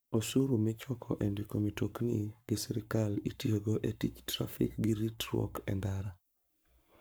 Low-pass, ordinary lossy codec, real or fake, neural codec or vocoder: none; none; fake; codec, 44.1 kHz, 7.8 kbps, Pupu-Codec